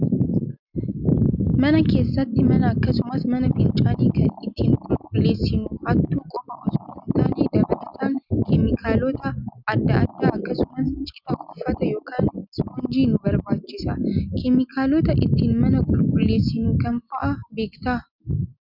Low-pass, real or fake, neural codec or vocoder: 5.4 kHz; real; none